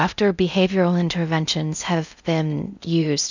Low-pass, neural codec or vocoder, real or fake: 7.2 kHz; codec, 16 kHz in and 24 kHz out, 0.6 kbps, FocalCodec, streaming, 2048 codes; fake